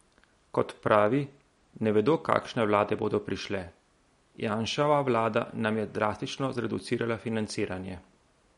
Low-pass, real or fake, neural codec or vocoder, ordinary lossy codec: 10.8 kHz; real; none; MP3, 48 kbps